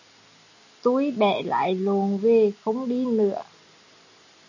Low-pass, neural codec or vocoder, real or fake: 7.2 kHz; none; real